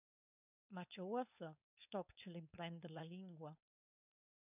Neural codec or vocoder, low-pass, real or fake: codec, 16 kHz, 4.8 kbps, FACodec; 3.6 kHz; fake